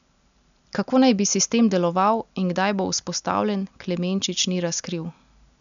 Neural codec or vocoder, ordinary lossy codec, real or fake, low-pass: none; none; real; 7.2 kHz